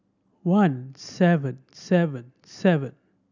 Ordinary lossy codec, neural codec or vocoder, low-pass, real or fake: none; none; 7.2 kHz; real